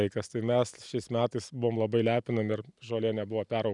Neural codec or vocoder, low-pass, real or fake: none; 10.8 kHz; real